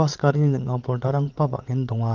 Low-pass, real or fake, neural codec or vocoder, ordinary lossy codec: 7.2 kHz; fake; vocoder, 22.05 kHz, 80 mel bands, Vocos; Opus, 32 kbps